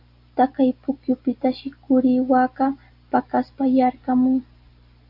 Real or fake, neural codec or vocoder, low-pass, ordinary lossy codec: real; none; 5.4 kHz; AAC, 48 kbps